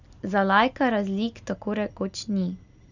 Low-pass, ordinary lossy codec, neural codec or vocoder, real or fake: 7.2 kHz; none; none; real